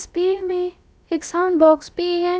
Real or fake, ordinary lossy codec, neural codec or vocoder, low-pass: fake; none; codec, 16 kHz, about 1 kbps, DyCAST, with the encoder's durations; none